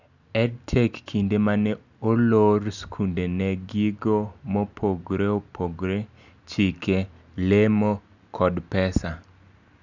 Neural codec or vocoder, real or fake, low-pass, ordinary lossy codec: none; real; 7.2 kHz; none